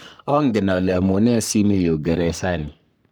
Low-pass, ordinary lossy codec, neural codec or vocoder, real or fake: none; none; codec, 44.1 kHz, 3.4 kbps, Pupu-Codec; fake